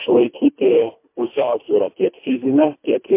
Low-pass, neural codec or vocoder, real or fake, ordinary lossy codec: 3.6 kHz; codec, 24 kHz, 1.5 kbps, HILCodec; fake; MP3, 24 kbps